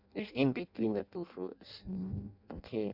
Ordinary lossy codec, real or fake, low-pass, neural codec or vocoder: none; fake; 5.4 kHz; codec, 16 kHz in and 24 kHz out, 0.6 kbps, FireRedTTS-2 codec